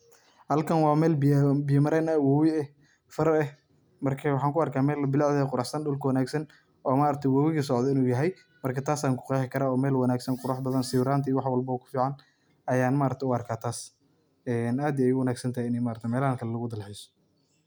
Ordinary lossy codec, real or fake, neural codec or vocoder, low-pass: none; real; none; none